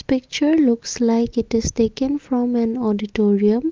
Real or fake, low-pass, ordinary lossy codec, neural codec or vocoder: real; 7.2 kHz; Opus, 32 kbps; none